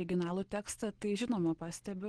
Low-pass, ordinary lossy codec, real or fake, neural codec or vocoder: 9.9 kHz; Opus, 16 kbps; real; none